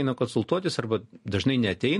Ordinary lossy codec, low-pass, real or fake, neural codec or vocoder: MP3, 48 kbps; 14.4 kHz; real; none